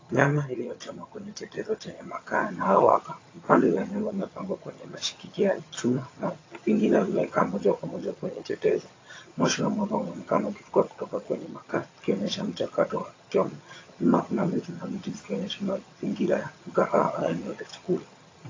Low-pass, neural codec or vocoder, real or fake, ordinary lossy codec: 7.2 kHz; vocoder, 22.05 kHz, 80 mel bands, HiFi-GAN; fake; AAC, 32 kbps